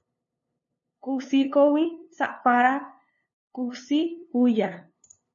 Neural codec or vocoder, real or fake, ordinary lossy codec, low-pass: codec, 16 kHz, 2 kbps, FunCodec, trained on LibriTTS, 25 frames a second; fake; MP3, 32 kbps; 7.2 kHz